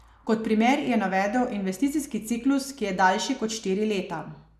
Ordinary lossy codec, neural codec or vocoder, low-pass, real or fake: none; none; 14.4 kHz; real